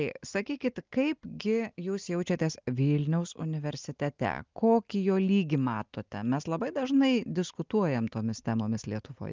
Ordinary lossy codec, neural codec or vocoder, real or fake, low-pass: Opus, 24 kbps; none; real; 7.2 kHz